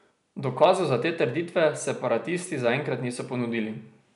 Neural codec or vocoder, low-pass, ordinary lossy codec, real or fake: none; 10.8 kHz; none; real